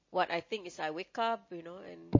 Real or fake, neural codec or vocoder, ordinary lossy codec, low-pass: real; none; MP3, 32 kbps; 7.2 kHz